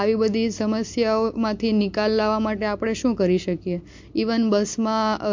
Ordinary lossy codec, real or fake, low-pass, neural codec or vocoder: MP3, 48 kbps; real; 7.2 kHz; none